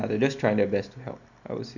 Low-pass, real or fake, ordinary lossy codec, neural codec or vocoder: 7.2 kHz; real; none; none